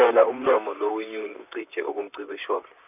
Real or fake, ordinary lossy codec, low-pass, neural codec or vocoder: fake; none; 3.6 kHz; vocoder, 44.1 kHz, 128 mel bands, Pupu-Vocoder